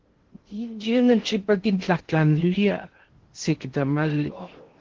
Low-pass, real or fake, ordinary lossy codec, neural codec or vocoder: 7.2 kHz; fake; Opus, 16 kbps; codec, 16 kHz in and 24 kHz out, 0.6 kbps, FocalCodec, streaming, 2048 codes